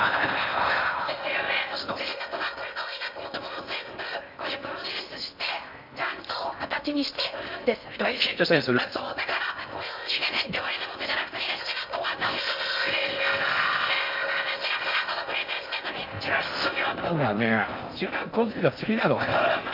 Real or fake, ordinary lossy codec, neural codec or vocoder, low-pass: fake; MP3, 48 kbps; codec, 16 kHz in and 24 kHz out, 0.6 kbps, FocalCodec, streaming, 4096 codes; 5.4 kHz